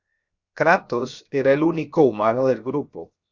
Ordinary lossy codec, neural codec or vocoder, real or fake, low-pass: Opus, 64 kbps; codec, 16 kHz, 0.7 kbps, FocalCodec; fake; 7.2 kHz